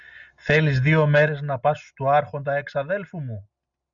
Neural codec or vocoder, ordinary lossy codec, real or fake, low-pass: none; MP3, 96 kbps; real; 7.2 kHz